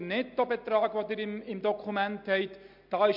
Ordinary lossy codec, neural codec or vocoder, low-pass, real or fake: MP3, 48 kbps; none; 5.4 kHz; real